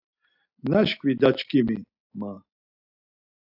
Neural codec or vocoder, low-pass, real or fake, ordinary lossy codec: none; 5.4 kHz; real; AAC, 48 kbps